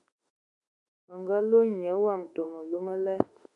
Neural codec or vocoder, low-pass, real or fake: autoencoder, 48 kHz, 32 numbers a frame, DAC-VAE, trained on Japanese speech; 10.8 kHz; fake